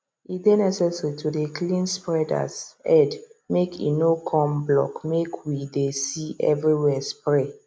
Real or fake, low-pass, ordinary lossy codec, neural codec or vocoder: real; none; none; none